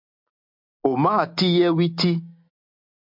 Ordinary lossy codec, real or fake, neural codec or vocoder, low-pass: MP3, 48 kbps; real; none; 5.4 kHz